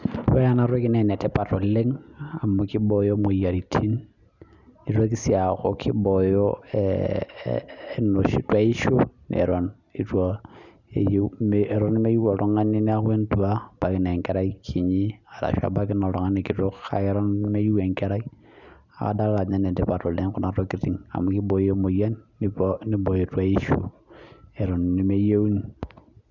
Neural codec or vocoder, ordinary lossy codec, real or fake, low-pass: none; none; real; 7.2 kHz